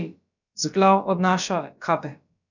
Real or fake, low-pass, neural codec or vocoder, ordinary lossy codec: fake; 7.2 kHz; codec, 16 kHz, about 1 kbps, DyCAST, with the encoder's durations; none